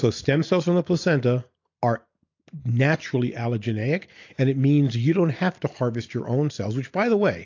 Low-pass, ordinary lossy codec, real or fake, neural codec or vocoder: 7.2 kHz; AAC, 48 kbps; real; none